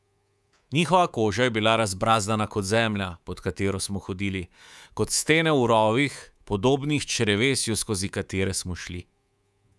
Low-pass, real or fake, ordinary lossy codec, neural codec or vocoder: none; fake; none; codec, 24 kHz, 3.1 kbps, DualCodec